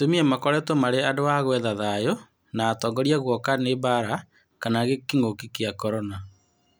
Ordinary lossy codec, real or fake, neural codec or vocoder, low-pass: none; real; none; none